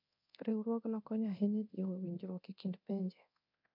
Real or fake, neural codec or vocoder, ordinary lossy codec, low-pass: fake; codec, 24 kHz, 0.9 kbps, DualCodec; MP3, 48 kbps; 5.4 kHz